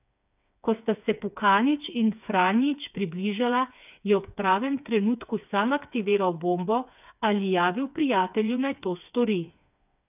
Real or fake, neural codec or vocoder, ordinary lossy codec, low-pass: fake; codec, 16 kHz, 4 kbps, FreqCodec, smaller model; none; 3.6 kHz